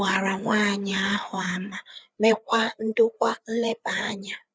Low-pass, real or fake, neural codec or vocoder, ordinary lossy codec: none; fake; codec, 16 kHz, 4 kbps, FreqCodec, larger model; none